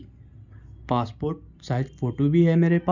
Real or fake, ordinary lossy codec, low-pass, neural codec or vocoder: real; none; 7.2 kHz; none